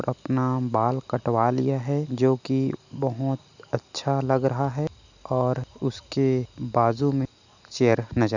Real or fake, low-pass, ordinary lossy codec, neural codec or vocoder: real; 7.2 kHz; none; none